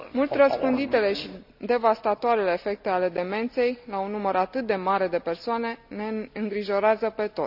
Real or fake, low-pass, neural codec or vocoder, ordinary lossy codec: real; 5.4 kHz; none; none